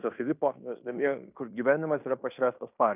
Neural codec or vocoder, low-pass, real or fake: codec, 16 kHz in and 24 kHz out, 0.9 kbps, LongCat-Audio-Codec, fine tuned four codebook decoder; 3.6 kHz; fake